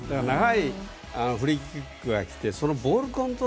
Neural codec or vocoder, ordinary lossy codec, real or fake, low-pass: none; none; real; none